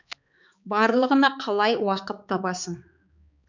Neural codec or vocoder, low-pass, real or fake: codec, 16 kHz, 4 kbps, X-Codec, HuBERT features, trained on balanced general audio; 7.2 kHz; fake